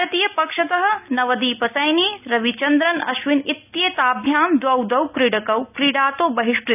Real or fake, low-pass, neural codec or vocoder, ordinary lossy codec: real; 3.6 kHz; none; none